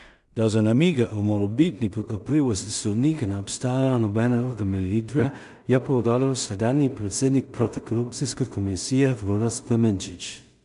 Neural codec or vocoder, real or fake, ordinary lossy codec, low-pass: codec, 16 kHz in and 24 kHz out, 0.4 kbps, LongCat-Audio-Codec, two codebook decoder; fake; none; 10.8 kHz